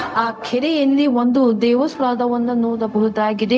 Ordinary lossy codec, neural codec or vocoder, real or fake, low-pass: none; codec, 16 kHz, 0.4 kbps, LongCat-Audio-Codec; fake; none